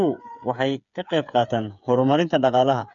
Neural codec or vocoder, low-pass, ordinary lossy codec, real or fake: codec, 16 kHz, 8 kbps, FreqCodec, smaller model; 7.2 kHz; MP3, 64 kbps; fake